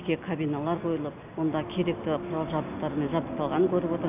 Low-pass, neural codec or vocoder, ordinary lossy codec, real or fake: 3.6 kHz; none; none; real